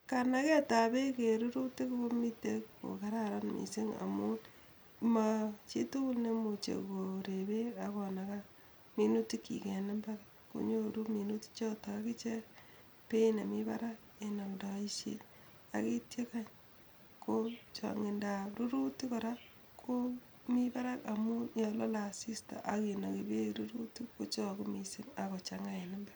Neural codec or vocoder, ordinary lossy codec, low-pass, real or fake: none; none; none; real